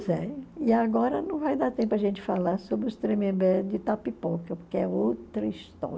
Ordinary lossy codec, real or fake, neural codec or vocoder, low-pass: none; real; none; none